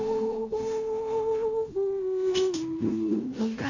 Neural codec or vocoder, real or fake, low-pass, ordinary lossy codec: codec, 16 kHz in and 24 kHz out, 0.9 kbps, LongCat-Audio-Codec, fine tuned four codebook decoder; fake; 7.2 kHz; none